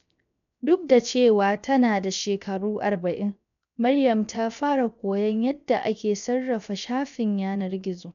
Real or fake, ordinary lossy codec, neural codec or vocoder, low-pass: fake; none; codec, 16 kHz, 0.7 kbps, FocalCodec; 7.2 kHz